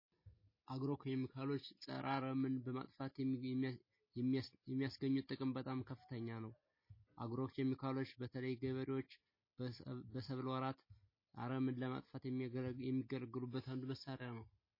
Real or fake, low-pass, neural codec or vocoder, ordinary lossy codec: real; 5.4 kHz; none; MP3, 24 kbps